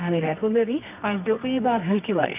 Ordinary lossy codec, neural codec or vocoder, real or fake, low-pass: AAC, 32 kbps; codec, 24 kHz, 0.9 kbps, WavTokenizer, medium music audio release; fake; 3.6 kHz